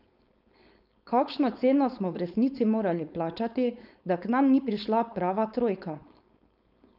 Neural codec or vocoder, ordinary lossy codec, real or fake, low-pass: codec, 16 kHz, 4.8 kbps, FACodec; none; fake; 5.4 kHz